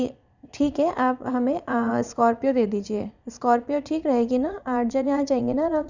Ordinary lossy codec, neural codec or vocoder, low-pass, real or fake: none; vocoder, 22.05 kHz, 80 mel bands, Vocos; 7.2 kHz; fake